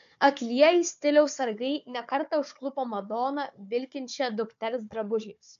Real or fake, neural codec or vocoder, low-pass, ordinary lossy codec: fake; codec, 16 kHz, 4 kbps, FunCodec, trained on Chinese and English, 50 frames a second; 7.2 kHz; MP3, 64 kbps